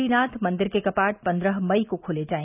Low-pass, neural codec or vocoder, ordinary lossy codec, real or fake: 3.6 kHz; none; none; real